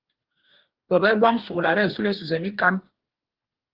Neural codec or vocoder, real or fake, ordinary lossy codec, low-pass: codec, 44.1 kHz, 2.6 kbps, DAC; fake; Opus, 16 kbps; 5.4 kHz